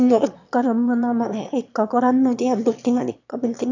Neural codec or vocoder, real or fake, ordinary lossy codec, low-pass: autoencoder, 22.05 kHz, a latent of 192 numbers a frame, VITS, trained on one speaker; fake; none; 7.2 kHz